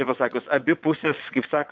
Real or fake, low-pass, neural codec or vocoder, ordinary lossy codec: fake; 7.2 kHz; vocoder, 22.05 kHz, 80 mel bands, WaveNeXt; MP3, 64 kbps